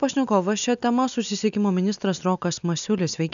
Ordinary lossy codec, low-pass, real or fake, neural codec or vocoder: MP3, 96 kbps; 7.2 kHz; real; none